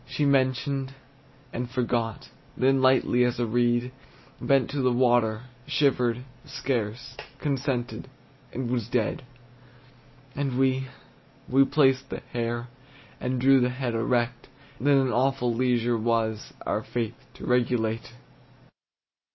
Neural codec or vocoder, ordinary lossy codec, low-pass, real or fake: none; MP3, 24 kbps; 7.2 kHz; real